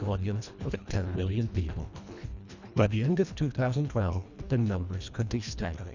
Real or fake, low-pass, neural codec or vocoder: fake; 7.2 kHz; codec, 24 kHz, 1.5 kbps, HILCodec